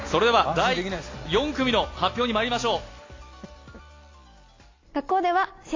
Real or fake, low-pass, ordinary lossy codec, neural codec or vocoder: real; 7.2 kHz; AAC, 32 kbps; none